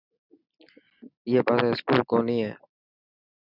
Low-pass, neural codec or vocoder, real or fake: 5.4 kHz; none; real